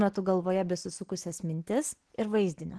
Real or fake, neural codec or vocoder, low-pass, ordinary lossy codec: real; none; 10.8 kHz; Opus, 16 kbps